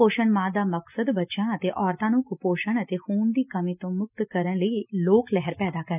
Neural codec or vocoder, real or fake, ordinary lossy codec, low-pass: none; real; none; 3.6 kHz